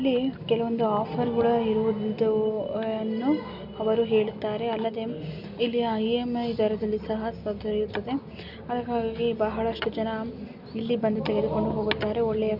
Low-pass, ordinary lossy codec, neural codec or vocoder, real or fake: 5.4 kHz; none; none; real